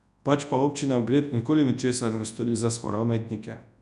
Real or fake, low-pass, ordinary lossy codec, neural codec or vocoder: fake; 10.8 kHz; none; codec, 24 kHz, 0.9 kbps, WavTokenizer, large speech release